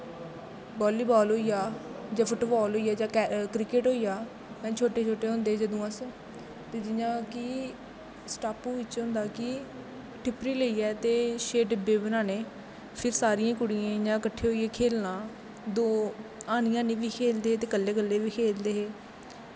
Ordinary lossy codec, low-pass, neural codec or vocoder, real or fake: none; none; none; real